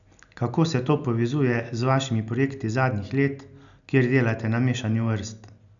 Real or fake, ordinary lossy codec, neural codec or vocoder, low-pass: real; none; none; 7.2 kHz